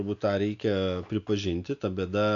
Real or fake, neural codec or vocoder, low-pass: real; none; 7.2 kHz